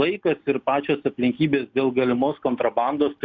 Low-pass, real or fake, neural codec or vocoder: 7.2 kHz; real; none